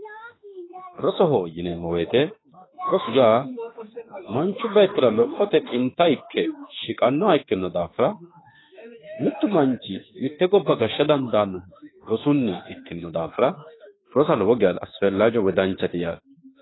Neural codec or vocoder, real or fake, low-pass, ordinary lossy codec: autoencoder, 48 kHz, 32 numbers a frame, DAC-VAE, trained on Japanese speech; fake; 7.2 kHz; AAC, 16 kbps